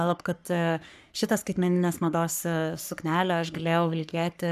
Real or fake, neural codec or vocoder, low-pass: fake; codec, 44.1 kHz, 3.4 kbps, Pupu-Codec; 14.4 kHz